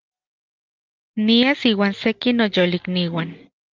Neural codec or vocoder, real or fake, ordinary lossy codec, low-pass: none; real; Opus, 32 kbps; 7.2 kHz